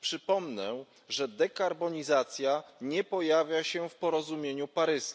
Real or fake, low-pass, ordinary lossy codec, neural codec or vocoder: real; none; none; none